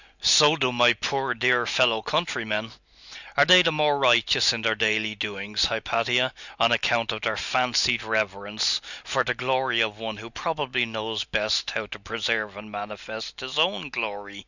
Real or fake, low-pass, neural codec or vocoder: real; 7.2 kHz; none